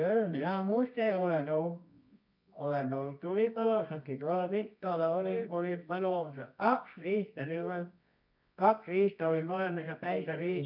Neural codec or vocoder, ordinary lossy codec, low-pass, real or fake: codec, 24 kHz, 0.9 kbps, WavTokenizer, medium music audio release; none; 5.4 kHz; fake